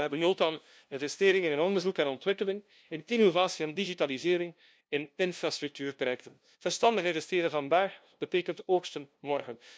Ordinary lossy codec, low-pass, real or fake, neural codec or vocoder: none; none; fake; codec, 16 kHz, 0.5 kbps, FunCodec, trained on LibriTTS, 25 frames a second